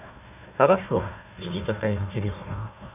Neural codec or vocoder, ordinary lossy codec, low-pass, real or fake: codec, 16 kHz, 1 kbps, FunCodec, trained on Chinese and English, 50 frames a second; none; 3.6 kHz; fake